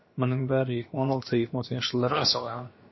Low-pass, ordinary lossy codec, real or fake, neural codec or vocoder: 7.2 kHz; MP3, 24 kbps; fake; codec, 16 kHz, about 1 kbps, DyCAST, with the encoder's durations